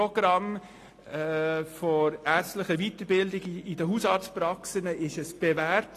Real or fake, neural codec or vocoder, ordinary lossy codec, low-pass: real; none; AAC, 48 kbps; 14.4 kHz